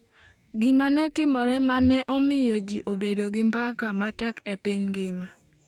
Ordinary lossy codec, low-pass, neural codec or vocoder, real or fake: none; 19.8 kHz; codec, 44.1 kHz, 2.6 kbps, DAC; fake